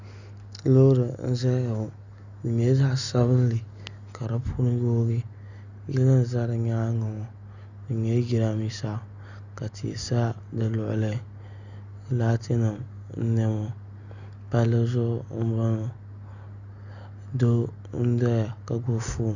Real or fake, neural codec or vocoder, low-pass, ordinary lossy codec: real; none; 7.2 kHz; Opus, 64 kbps